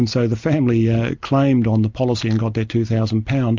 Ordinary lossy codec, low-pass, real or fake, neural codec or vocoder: MP3, 64 kbps; 7.2 kHz; real; none